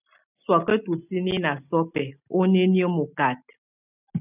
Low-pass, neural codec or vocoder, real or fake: 3.6 kHz; none; real